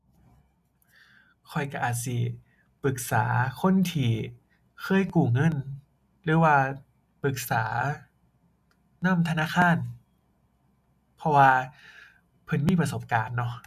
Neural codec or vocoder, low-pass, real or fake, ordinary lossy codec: none; 14.4 kHz; real; none